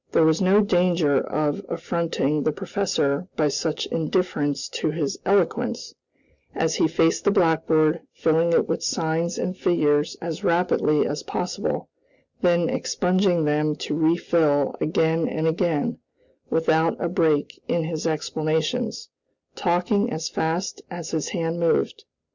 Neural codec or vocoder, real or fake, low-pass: none; real; 7.2 kHz